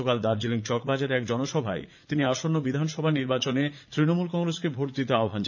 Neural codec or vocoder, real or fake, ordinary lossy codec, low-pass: vocoder, 44.1 kHz, 80 mel bands, Vocos; fake; none; 7.2 kHz